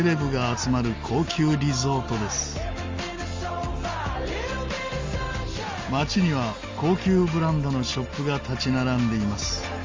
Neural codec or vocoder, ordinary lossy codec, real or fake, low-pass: none; Opus, 32 kbps; real; 7.2 kHz